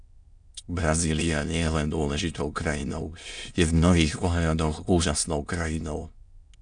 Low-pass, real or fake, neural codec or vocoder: 9.9 kHz; fake; autoencoder, 22.05 kHz, a latent of 192 numbers a frame, VITS, trained on many speakers